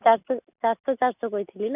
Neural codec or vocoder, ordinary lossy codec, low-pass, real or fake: none; none; 3.6 kHz; real